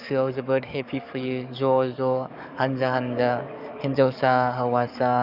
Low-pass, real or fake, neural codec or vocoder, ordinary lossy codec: 5.4 kHz; fake; codec, 44.1 kHz, 7.8 kbps, DAC; none